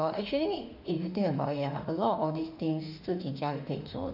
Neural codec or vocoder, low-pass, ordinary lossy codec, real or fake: autoencoder, 48 kHz, 32 numbers a frame, DAC-VAE, trained on Japanese speech; 5.4 kHz; none; fake